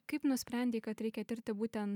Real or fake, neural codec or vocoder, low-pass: real; none; 19.8 kHz